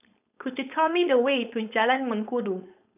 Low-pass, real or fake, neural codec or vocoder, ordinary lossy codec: 3.6 kHz; fake; codec, 16 kHz, 4.8 kbps, FACodec; none